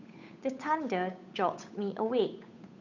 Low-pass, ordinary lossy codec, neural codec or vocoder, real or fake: 7.2 kHz; none; codec, 16 kHz, 8 kbps, FunCodec, trained on Chinese and English, 25 frames a second; fake